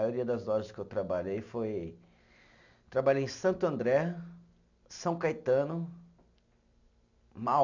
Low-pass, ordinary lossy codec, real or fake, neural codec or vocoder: 7.2 kHz; none; real; none